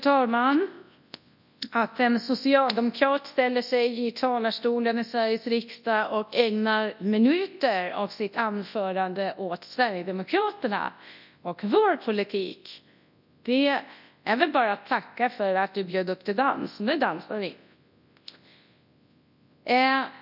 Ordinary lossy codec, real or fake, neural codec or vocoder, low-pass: none; fake; codec, 24 kHz, 0.9 kbps, WavTokenizer, large speech release; 5.4 kHz